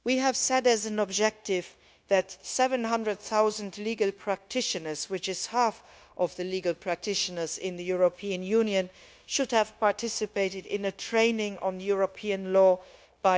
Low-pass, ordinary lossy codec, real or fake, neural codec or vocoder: none; none; fake; codec, 16 kHz, 0.9 kbps, LongCat-Audio-Codec